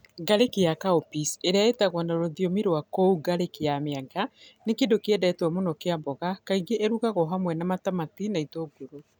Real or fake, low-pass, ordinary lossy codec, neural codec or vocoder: real; none; none; none